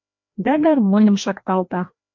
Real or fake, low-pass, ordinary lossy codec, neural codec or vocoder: fake; 7.2 kHz; MP3, 48 kbps; codec, 16 kHz, 1 kbps, FreqCodec, larger model